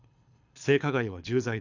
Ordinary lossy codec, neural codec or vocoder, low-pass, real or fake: none; codec, 24 kHz, 6 kbps, HILCodec; 7.2 kHz; fake